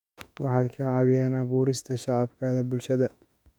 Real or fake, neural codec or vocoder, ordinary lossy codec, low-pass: fake; autoencoder, 48 kHz, 32 numbers a frame, DAC-VAE, trained on Japanese speech; none; 19.8 kHz